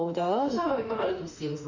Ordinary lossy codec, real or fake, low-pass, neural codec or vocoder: none; fake; 7.2 kHz; autoencoder, 48 kHz, 32 numbers a frame, DAC-VAE, trained on Japanese speech